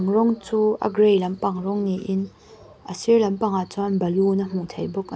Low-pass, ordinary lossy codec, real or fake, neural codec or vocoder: none; none; real; none